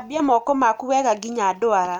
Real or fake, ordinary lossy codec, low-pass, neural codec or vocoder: real; none; 19.8 kHz; none